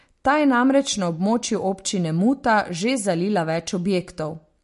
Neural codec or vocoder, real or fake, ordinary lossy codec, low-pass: none; real; MP3, 48 kbps; 14.4 kHz